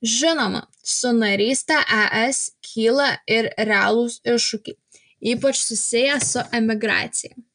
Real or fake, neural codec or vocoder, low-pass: real; none; 9.9 kHz